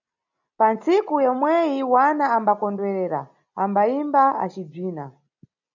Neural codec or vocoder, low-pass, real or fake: none; 7.2 kHz; real